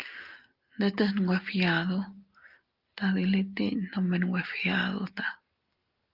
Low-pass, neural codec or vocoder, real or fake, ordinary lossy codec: 5.4 kHz; none; real; Opus, 32 kbps